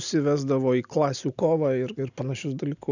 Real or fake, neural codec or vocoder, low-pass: real; none; 7.2 kHz